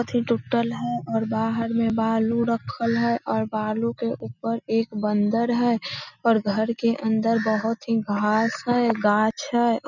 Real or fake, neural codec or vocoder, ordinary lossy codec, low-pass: real; none; none; 7.2 kHz